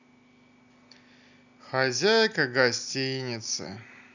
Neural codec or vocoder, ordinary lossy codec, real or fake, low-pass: none; none; real; 7.2 kHz